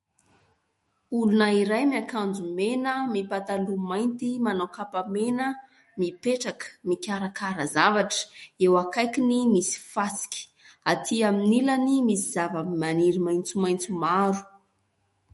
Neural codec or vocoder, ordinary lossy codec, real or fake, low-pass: autoencoder, 48 kHz, 128 numbers a frame, DAC-VAE, trained on Japanese speech; MP3, 48 kbps; fake; 19.8 kHz